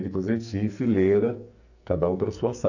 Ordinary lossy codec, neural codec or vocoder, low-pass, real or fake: none; codec, 44.1 kHz, 2.6 kbps, SNAC; 7.2 kHz; fake